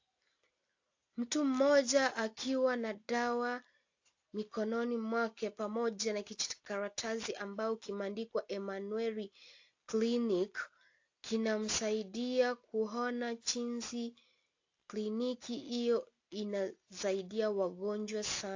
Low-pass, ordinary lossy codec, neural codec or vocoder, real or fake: 7.2 kHz; AAC, 48 kbps; none; real